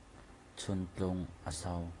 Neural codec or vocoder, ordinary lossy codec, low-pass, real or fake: none; AAC, 32 kbps; 10.8 kHz; real